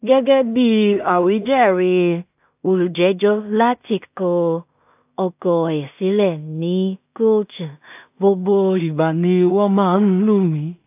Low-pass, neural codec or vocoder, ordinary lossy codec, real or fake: 3.6 kHz; codec, 16 kHz in and 24 kHz out, 0.4 kbps, LongCat-Audio-Codec, two codebook decoder; AAC, 32 kbps; fake